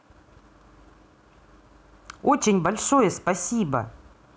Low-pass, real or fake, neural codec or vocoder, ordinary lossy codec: none; real; none; none